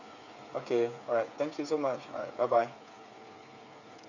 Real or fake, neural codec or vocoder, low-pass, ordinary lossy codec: fake; codec, 16 kHz, 8 kbps, FreqCodec, smaller model; 7.2 kHz; none